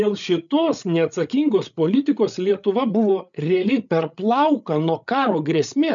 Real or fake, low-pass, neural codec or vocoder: fake; 7.2 kHz; codec, 16 kHz, 8 kbps, FreqCodec, larger model